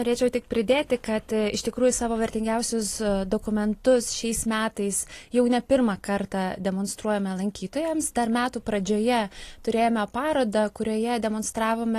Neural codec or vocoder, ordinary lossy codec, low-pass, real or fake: none; AAC, 48 kbps; 14.4 kHz; real